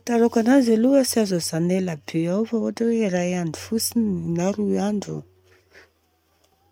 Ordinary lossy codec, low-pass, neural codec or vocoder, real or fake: none; 19.8 kHz; none; real